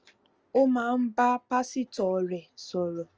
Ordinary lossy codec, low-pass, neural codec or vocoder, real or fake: Opus, 24 kbps; 7.2 kHz; none; real